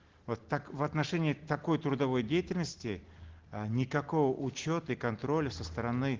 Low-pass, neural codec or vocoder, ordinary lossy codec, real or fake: 7.2 kHz; none; Opus, 16 kbps; real